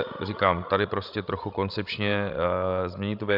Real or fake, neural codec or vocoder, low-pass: fake; codec, 16 kHz, 16 kbps, FreqCodec, larger model; 5.4 kHz